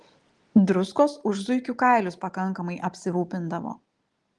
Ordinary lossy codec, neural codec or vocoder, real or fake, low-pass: Opus, 24 kbps; none; real; 10.8 kHz